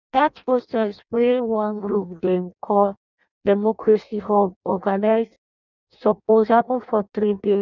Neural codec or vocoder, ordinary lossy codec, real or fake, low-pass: codec, 16 kHz in and 24 kHz out, 0.6 kbps, FireRedTTS-2 codec; none; fake; 7.2 kHz